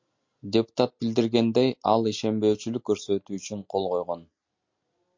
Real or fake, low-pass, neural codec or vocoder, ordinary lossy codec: real; 7.2 kHz; none; MP3, 48 kbps